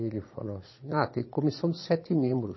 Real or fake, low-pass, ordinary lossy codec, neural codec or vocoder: fake; 7.2 kHz; MP3, 24 kbps; vocoder, 22.05 kHz, 80 mel bands, Vocos